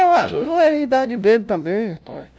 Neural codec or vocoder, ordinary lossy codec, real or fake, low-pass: codec, 16 kHz, 0.5 kbps, FunCodec, trained on LibriTTS, 25 frames a second; none; fake; none